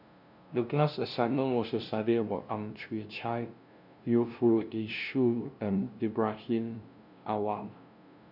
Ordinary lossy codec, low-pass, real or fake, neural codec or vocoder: none; 5.4 kHz; fake; codec, 16 kHz, 0.5 kbps, FunCodec, trained on LibriTTS, 25 frames a second